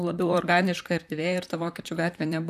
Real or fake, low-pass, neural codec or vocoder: fake; 14.4 kHz; vocoder, 44.1 kHz, 128 mel bands, Pupu-Vocoder